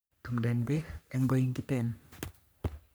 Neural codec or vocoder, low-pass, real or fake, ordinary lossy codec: codec, 44.1 kHz, 3.4 kbps, Pupu-Codec; none; fake; none